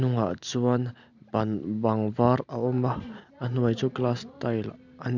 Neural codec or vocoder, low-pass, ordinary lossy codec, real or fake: none; 7.2 kHz; none; real